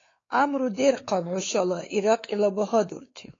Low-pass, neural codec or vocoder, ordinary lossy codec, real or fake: 7.2 kHz; codec, 16 kHz, 4 kbps, X-Codec, WavLM features, trained on Multilingual LibriSpeech; AAC, 32 kbps; fake